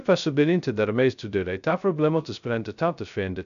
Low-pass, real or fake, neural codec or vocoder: 7.2 kHz; fake; codec, 16 kHz, 0.2 kbps, FocalCodec